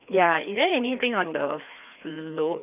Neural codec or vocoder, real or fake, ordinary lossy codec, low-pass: codec, 16 kHz, 2 kbps, FreqCodec, larger model; fake; none; 3.6 kHz